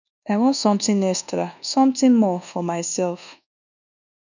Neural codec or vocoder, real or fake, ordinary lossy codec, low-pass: codec, 24 kHz, 1.2 kbps, DualCodec; fake; none; 7.2 kHz